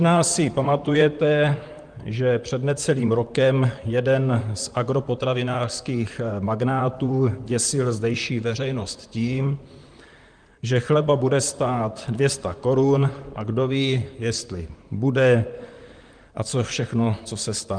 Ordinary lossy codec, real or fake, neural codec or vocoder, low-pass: Opus, 32 kbps; fake; vocoder, 44.1 kHz, 128 mel bands, Pupu-Vocoder; 9.9 kHz